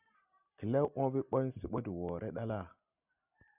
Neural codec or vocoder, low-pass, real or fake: none; 3.6 kHz; real